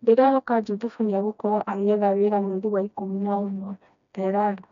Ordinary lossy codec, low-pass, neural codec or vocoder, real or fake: MP3, 96 kbps; 7.2 kHz; codec, 16 kHz, 1 kbps, FreqCodec, smaller model; fake